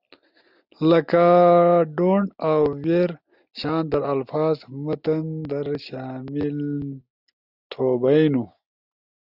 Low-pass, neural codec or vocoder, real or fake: 5.4 kHz; none; real